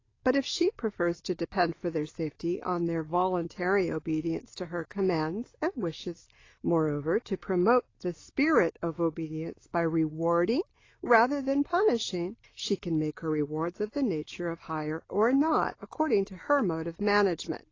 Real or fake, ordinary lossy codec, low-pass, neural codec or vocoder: real; AAC, 32 kbps; 7.2 kHz; none